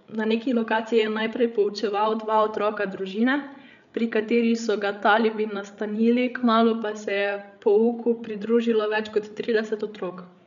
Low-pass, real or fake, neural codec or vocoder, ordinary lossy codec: 7.2 kHz; fake; codec, 16 kHz, 8 kbps, FreqCodec, larger model; none